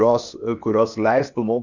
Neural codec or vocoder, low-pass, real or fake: codec, 16 kHz, 0.8 kbps, ZipCodec; 7.2 kHz; fake